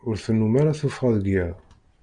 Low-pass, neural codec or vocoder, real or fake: 9.9 kHz; none; real